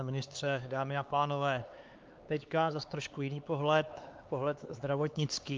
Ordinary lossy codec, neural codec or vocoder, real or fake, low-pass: Opus, 32 kbps; codec, 16 kHz, 4 kbps, X-Codec, HuBERT features, trained on LibriSpeech; fake; 7.2 kHz